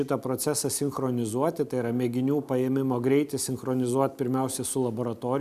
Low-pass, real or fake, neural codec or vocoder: 14.4 kHz; real; none